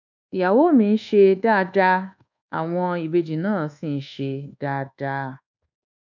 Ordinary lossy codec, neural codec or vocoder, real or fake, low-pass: none; codec, 24 kHz, 1.2 kbps, DualCodec; fake; 7.2 kHz